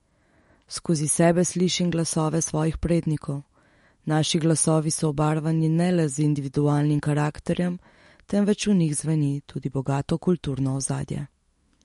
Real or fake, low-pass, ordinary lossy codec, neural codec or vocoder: fake; 19.8 kHz; MP3, 48 kbps; autoencoder, 48 kHz, 128 numbers a frame, DAC-VAE, trained on Japanese speech